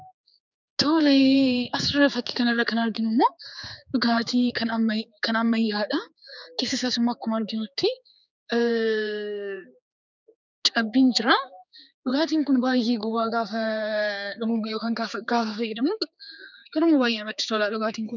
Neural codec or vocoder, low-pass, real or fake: codec, 16 kHz, 4 kbps, X-Codec, HuBERT features, trained on general audio; 7.2 kHz; fake